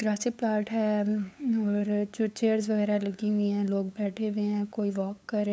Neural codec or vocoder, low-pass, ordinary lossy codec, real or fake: codec, 16 kHz, 8 kbps, FunCodec, trained on LibriTTS, 25 frames a second; none; none; fake